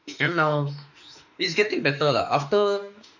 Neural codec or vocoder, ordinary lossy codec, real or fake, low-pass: autoencoder, 48 kHz, 32 numbers a frame, DAC-VAE, trained on Japanese speech; none; fake; 7.2 kHz